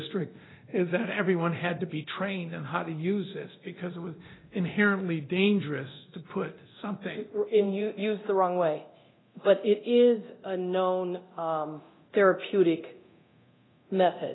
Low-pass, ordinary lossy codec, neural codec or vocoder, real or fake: 7.2 kHz; AAC, 16 kbps; codec, 24 kHz, 0.9 kbps, DualCodec; fake